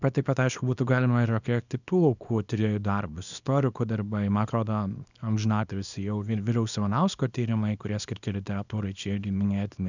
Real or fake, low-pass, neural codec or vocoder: fake; 7.2 kHz; codec, 24 kHz, 0.9 kbps, WavTokenizer, small release